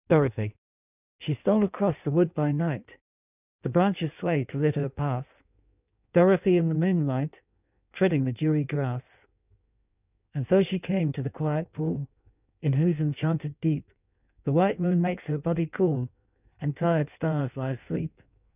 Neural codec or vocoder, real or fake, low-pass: codec, 16 kHz in and 24 kHz out, 1.1 kbps, FireRedTTS-2 codec; fake; 3.6 kHz